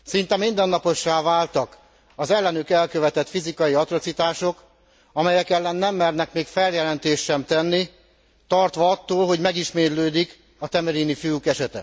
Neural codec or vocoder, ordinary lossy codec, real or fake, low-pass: none; none; real; none